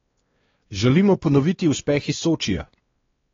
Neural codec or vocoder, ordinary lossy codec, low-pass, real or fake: codec, 16 kHz, 2 kbps, X-Codec, WavLM features, trained on Multilingual LibriSpeech; AAC, 24 kbps; 7.2 kHz; fake